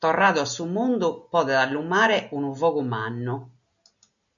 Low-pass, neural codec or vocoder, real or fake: 7.2 kHz; none; real